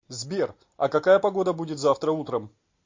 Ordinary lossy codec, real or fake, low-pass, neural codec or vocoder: MP3, 48 kbps; real; 7.2 kHz; none